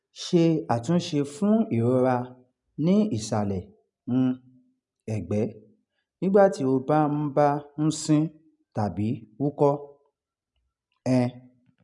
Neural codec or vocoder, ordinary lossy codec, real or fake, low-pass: none; none; real; 10.8 kHz